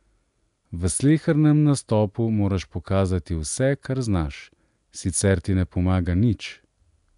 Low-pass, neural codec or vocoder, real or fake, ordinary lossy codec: 10.8 kHz; none; real; none